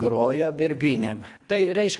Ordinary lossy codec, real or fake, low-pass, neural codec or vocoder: AAC, 64 kbps; fake; 10.8 kHz; codec, 24 kHz, 1.5 kbps, HILCodec